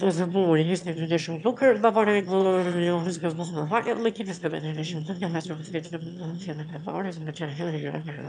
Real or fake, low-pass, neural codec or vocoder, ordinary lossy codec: fake; 9.9 kHz; autoencoder, 22.05 kHz, a latent of 192 numbers a frame, VITS, trained on one speaker; MP3, 96 kbps